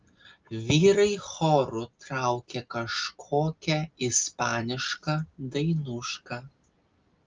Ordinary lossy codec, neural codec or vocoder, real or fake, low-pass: Opus, 24 kbps; none; real; 7.2 kHz